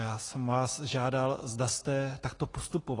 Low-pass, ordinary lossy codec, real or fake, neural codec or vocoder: 10.8 kHz; AAC, 32 kbps; real; none